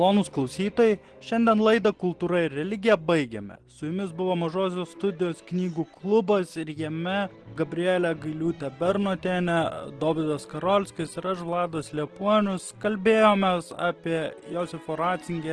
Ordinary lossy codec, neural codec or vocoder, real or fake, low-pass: Opus, 16 kbps; none; real; 10.8 kHz